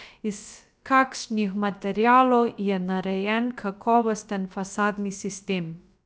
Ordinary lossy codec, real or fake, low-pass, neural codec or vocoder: none; fake; none; codec, 16 kHz, about 1 kbps, DyCAST, with the encoder's durations